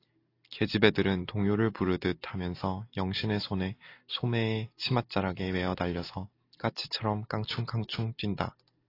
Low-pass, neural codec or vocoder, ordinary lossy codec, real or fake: 5.4 kHz; none; AAC, 32 kbps; real